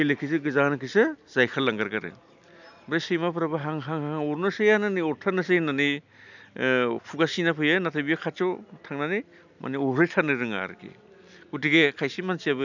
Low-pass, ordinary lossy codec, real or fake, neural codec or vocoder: 7.2 kHz; none; real; none